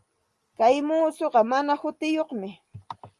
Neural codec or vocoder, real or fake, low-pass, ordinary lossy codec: none; real; 10.8 kHz; Opus, 32 kbps